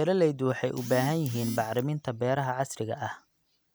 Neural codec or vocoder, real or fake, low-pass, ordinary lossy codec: none; real; none; none